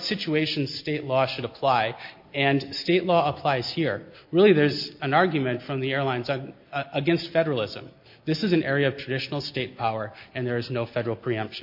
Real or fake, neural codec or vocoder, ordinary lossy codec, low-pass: real; none; MP3, 32 kbps; 5.4 kHz